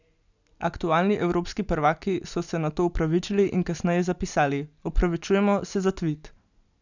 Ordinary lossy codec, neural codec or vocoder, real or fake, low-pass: none; none; real; 7.2 kHz